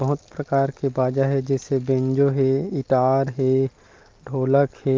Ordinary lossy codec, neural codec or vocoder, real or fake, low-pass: Opus, 32 kbps; none; real; 7.2 kHz